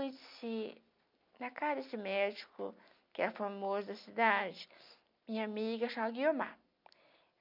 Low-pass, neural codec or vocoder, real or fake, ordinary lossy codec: 5.4 kHz; none; real; MP3, 48 kbps